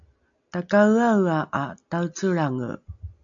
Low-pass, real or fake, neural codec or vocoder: 7.2 kHz; real; none